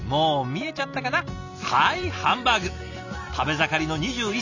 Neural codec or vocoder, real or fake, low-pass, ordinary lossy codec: none; real; 7.2 kHz; none